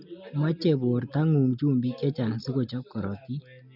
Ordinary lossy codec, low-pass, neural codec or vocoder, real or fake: none; 5.4 kHz; none; real